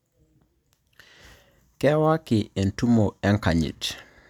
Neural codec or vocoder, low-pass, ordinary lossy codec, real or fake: none; 19.8 kHz; none; real